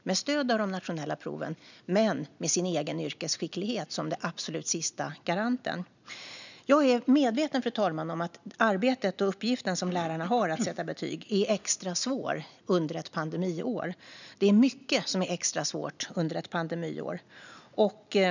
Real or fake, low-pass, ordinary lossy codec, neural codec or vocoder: real; 7.2 kHz; none; none